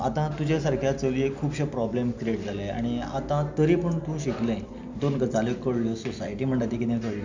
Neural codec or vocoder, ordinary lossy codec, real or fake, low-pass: none; MP3, 64 kbps; real; 7.2 kHz